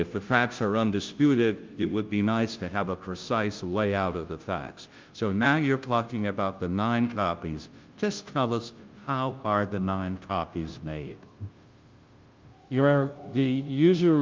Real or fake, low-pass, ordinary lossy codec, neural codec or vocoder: fake; 7.2 kHz; Opus, 32 kbps; codec, 16 kHz, 0.5 kbps, FunCodec, trained on Chinese and English, 25 frames a second